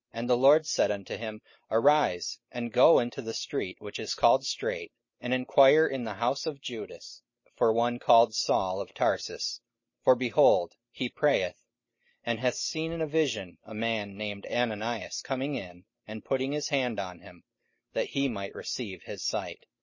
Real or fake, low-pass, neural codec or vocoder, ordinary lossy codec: real; 7.2 kHz; none; MP3, 32 kbps